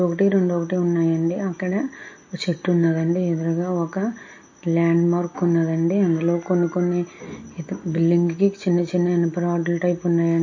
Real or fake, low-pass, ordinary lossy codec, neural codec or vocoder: real; 7.2 kHz; MP3, 32 kbps; none